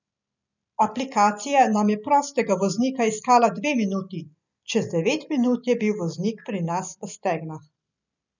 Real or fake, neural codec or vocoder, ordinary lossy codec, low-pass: real; none; none; 7.2 kHz